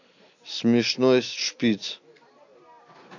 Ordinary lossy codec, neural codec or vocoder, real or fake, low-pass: AAC, 48 kbps; none; real; 7.2 kHz